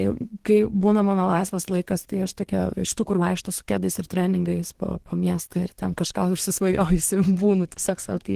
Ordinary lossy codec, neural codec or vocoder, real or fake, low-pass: Opus, 16 kbps; codec, 32 kHz, 1.9 kbps, SNAC; fake; 14.4 kHz